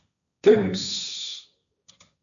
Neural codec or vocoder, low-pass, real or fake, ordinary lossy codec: codec, 16 kHz, 1.1 kbps, Voila-Tokenizer; 7.2 kHz; fake; MP3, 96 kbps